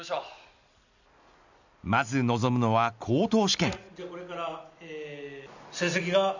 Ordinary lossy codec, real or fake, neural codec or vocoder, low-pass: none; real; none; 7.2 kHz